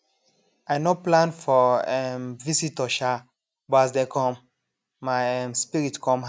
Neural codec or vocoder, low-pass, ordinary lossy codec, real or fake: none; none; none; real